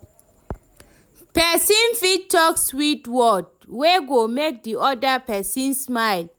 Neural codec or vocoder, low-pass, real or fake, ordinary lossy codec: none; none; real; none